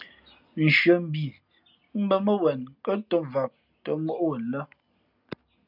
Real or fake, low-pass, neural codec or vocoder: real; 5.4 kHz; none